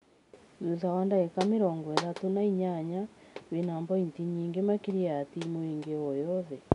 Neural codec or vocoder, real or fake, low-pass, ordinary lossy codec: none; real; 10.8 kHz; none